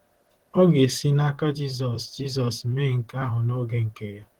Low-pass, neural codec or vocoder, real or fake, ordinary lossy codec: 19.8 kHz; vocoder, 44.1 kHz, 128 mel bands, Pupu-Vocoder; fake; Opus, 16 kbps